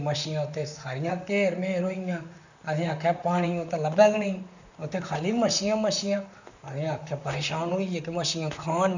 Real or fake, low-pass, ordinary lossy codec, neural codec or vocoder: fake; 7.2 kHz; none; vocoder, 44.1 kHz, 128 mel bands, Pupu-Vocoder